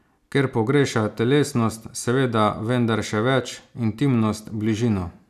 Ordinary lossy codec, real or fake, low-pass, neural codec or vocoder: none; real; 14.4 kHz; none